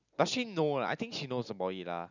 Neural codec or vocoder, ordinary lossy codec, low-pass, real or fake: none; none; 7.2 kHz; real